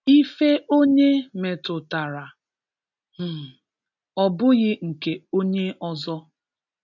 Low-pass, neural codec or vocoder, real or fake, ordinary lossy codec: 7.2 kHz; none; real; none